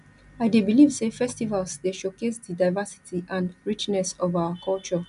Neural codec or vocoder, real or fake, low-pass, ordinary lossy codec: none; real; 10.8 kHz; none